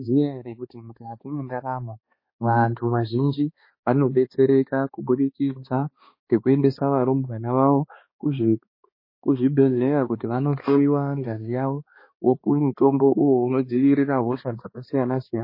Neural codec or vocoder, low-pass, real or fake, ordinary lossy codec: codec, 16 kHz, 2 kbps, X-Codec, HuBERT features, trained on balanced general audio; 5.4 kHz; fake; MP3, 24 kbps